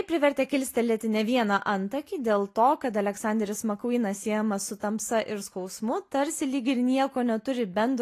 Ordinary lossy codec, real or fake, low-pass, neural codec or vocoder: AAC, 48 kbps; real; 14.4 kHz; none